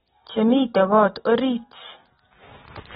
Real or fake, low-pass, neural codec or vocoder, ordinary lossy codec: real; 7.2 kHz; none; AAC, 16 kbps